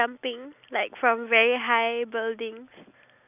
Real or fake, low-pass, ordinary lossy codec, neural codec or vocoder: real; 3.6 kHz; none; none